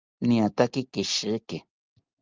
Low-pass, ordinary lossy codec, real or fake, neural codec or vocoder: 7.2 kHz; Opus, 32 kbps; real; none